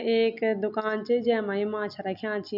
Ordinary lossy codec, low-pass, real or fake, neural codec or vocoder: none; 5.4 kHz; real; none